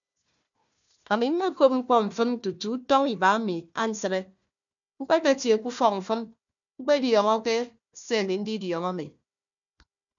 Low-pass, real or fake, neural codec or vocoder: 7.2 kHz; fake; codec, 16 kHz, 1 kbps, FunCodec, trained on Chinese and English, 50 frames a second